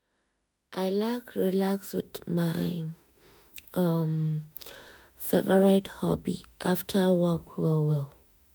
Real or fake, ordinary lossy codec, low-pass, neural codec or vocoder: fake; none; none; autoencoder, 48 kHz, 32 numbers a frame, DAC-VAE, trained on Japanese speech